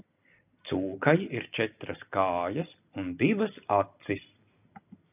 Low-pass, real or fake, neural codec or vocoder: 3.6 kHz; real; none